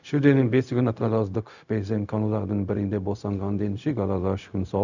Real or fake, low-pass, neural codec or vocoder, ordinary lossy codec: fake; 7.2 kHz; codec, 16 kHz, 0.4 kbps, LongCat-Audio-Codec; none